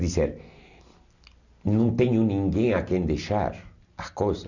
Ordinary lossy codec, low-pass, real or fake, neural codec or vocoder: none; 7.2 kHz; real; none